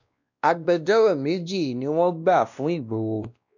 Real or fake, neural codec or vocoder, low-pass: fake; codec, 16 kHz, 1 kbps, X-Codec, WavLM features, trained on Multilingual LibriSpeech; 7.2 kHz